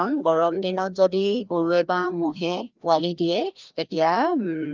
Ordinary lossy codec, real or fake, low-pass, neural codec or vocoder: Opus, 32 kbps; fake; 7.2 kHz; codec, 16 kHz, 1 kbps, FreqCodec, larger model